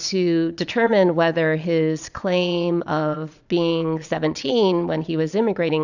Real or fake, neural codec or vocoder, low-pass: fake; vocoder, 22.05 kHz, 80 mel bands, Vocos; 7.2 kHz